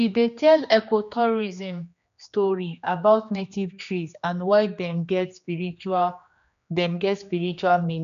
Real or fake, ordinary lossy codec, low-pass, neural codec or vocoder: fake; none; 7.2 kHz; codec, 16 kHz, 2 kbps, X-Codec, HuBERT features, trained on general audio